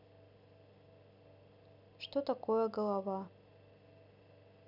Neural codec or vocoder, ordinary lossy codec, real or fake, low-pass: none; none; real; 5.4 kHz